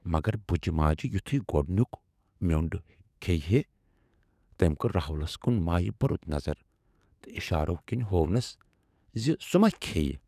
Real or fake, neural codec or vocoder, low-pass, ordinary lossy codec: fake; codec, 44.1 kHz, 7.8 kbps, DAC; 14.4 kHz; none